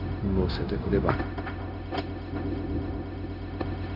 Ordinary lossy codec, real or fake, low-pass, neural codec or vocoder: none; fake; 5.4 kHz; codec, 16 kHz, 0.4 kbps, LongCat-Audio-Codec